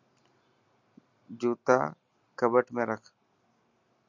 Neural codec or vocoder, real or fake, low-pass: none; real; 7.2 kHz